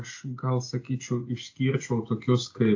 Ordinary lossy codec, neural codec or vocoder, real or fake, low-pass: AAC, 48 kbps; none; real; 7.2 kHz